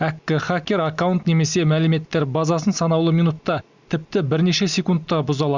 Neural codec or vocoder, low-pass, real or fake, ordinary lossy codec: none; 7.2 kHz; real; Opus, 64 kbps